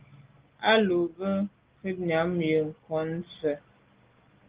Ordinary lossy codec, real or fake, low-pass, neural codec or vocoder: Opus, 16 kbps; real; 3.6 kHz; none